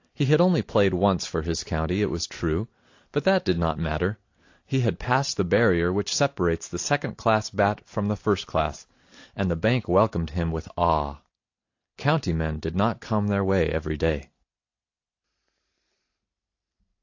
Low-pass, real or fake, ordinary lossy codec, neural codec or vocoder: 7.2 kHz; real; AAC, 48 kbps; none